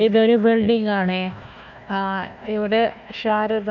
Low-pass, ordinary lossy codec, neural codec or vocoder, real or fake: 7.2 kHz; none; codec, 16 kHz, 1 kbps, FunCodec, trained on Chinese and English, 50 frames a second; fake